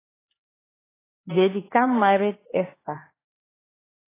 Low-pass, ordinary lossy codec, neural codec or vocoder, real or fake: 3.6 kHz; AAC, 16 kbps; codec, 16 kHz, 2 kbps, X-Codec, HuBERT features, trained on LibriSpeech; fake